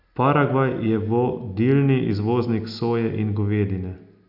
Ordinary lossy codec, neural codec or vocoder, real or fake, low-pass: none; none; real; 5.4 kHz